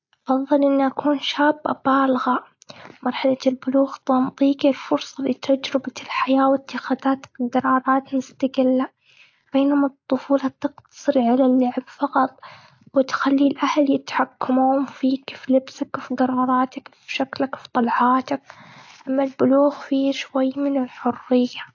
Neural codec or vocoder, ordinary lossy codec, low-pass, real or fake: none; AAC, 48 kbps; 7.2 kHz; real